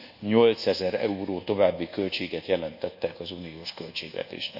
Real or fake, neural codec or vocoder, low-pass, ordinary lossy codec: fake; codec, 24 kHz, 1.2 kbps, DualCodec; 5.4 kHz; none